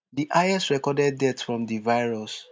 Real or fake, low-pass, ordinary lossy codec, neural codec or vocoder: real; none; none; none